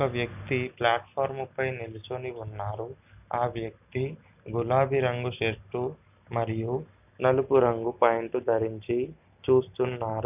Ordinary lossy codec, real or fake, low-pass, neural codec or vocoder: none; real; 3.6 kHz; none